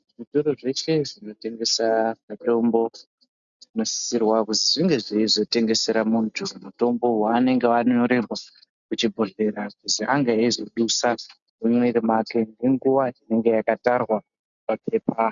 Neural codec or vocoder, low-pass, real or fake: none; 7.2 kHz; real